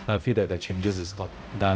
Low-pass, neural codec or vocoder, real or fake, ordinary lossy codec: none; codec, 16 kHz, 0.5 kbps, X-Codec, HuBERT features, trained on balanced general audio; fake; none